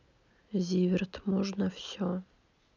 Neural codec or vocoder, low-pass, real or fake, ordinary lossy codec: none; 7.2 kHz; real; none